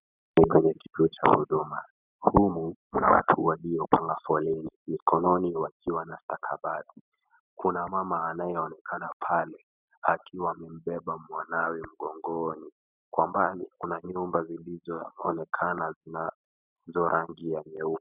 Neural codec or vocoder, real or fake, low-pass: none; real; 3.6 kHz